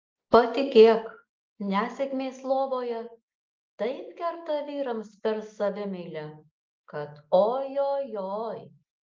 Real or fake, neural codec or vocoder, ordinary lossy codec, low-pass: real; none; Opus, 24 kbps; 7.2 kHz